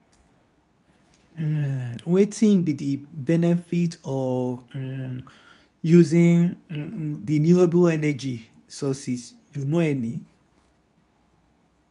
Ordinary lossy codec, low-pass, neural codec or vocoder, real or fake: none; 10.8 kHz; codec, 24 kHz, 0.9 kbps, WavTokenizer, medium speech release version 2; fake